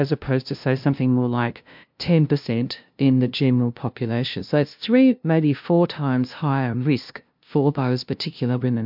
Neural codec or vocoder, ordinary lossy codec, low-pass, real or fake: codec, 16 kHz, 0.5 kbps, FunCodec, trained on LibriTTS, 25 frames a second; MP3, 48 kbps; 5.4 kHz; fake